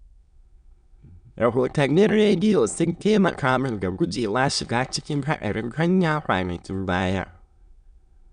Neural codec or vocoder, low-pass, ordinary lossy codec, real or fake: autoencoder, 22.05 kHz, a latent of 192 numbers a frame, VITS, trained on many speakers; 9.9 kHz; none; fake